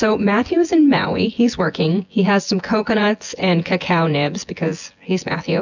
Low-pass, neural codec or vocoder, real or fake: 7.2 kHz; vocoder, 24 kHz, 100 mel bands, Vocos; fake